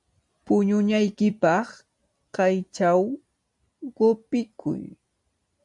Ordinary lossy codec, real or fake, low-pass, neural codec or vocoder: MP3, 64 kbps; real; 10.8 kHz; none